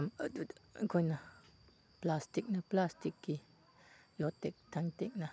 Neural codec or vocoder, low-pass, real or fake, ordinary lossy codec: none; none; real; none